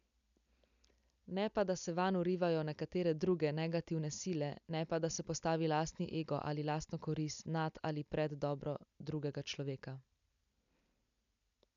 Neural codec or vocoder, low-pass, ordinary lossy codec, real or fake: none; 7.2 kHz; MP3, 96 kbps; real